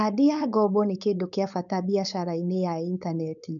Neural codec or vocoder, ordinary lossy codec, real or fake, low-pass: codec, 16 kHz, 4.8 kbps, FACodec; none; fake; 7.2 kHz